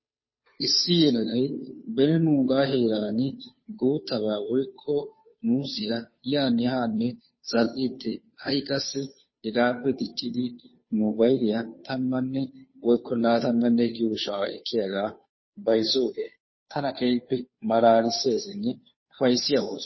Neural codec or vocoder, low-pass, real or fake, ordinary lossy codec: codec, 16 kHz, 2 kbps, FunCodec, trained on Chinese and English, 25 frames a second; 7.2 kHz; fake; MP3, 24 kbps